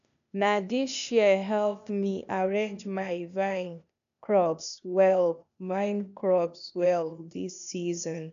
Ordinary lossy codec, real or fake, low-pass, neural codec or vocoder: none; fake; 7.2 kHz; codec, 16 kHz, 0.8 kbps, ZipCodec